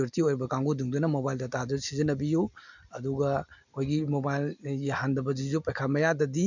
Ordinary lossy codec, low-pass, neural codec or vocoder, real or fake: none; 7.2 kHz; none; real